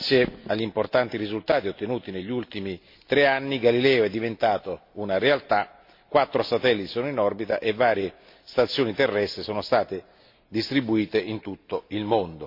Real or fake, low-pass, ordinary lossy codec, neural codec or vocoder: real; 5.4 kHz; MP3, 32 kbps; none